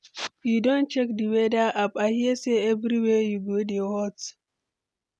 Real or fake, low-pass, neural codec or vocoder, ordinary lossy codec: real; none; none; none